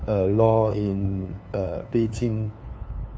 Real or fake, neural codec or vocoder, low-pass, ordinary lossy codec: fake; codec, 16 kHz, 2 kbps, FunCodec, trained on LibriTTS, 25 frames a second; none; none